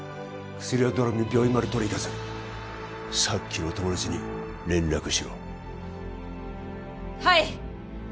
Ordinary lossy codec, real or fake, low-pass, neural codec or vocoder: none; real; none; none